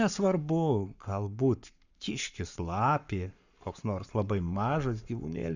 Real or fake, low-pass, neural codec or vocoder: fake; 7.2 kHz; codec, 44.1 kHz, 7.8 kbps, Pupu-Codec